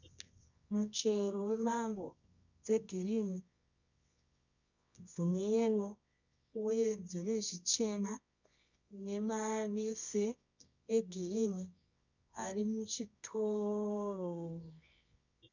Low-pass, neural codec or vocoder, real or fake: 7.2 kHz; codec, 24 kHz, 0.9 kbps, WavTokenizer, medium music audio release; fake